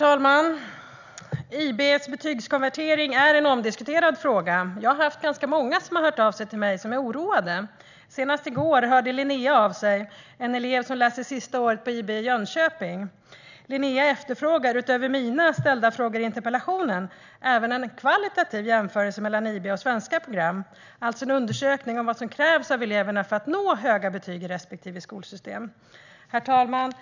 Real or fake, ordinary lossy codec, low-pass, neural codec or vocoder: real; none; 7.2 kHz; none